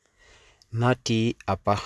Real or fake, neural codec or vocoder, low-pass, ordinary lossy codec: real; none; none; none